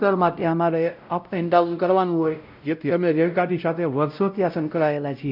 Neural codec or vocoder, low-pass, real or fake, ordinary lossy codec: codec, 16 kHz, 0.5 kbps, X-Codec, WavLM features, trained on Multilingual LibriSpeech; 5.4 kHz; fake; none